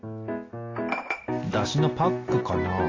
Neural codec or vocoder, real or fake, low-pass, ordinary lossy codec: none; real; 7.2 kHz; AAC, 32 kbps